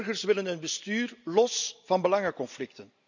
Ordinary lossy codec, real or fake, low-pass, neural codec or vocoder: none; real; 7.2 kHz; none